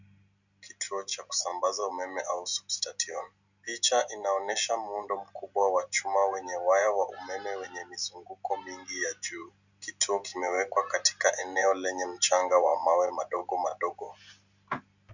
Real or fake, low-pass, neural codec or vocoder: real; 7.2 kHz; none